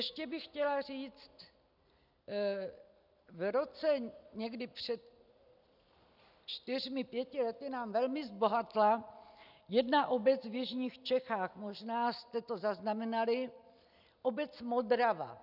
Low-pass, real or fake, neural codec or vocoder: 5.4 kHz; real; none